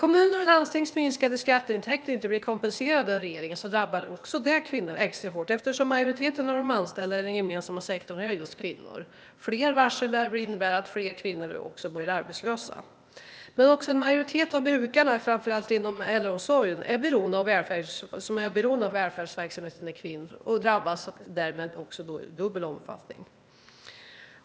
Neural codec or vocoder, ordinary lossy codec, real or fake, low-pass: codec, 16 kHz, 0.8 kbps, ZipCodec; none; fake; none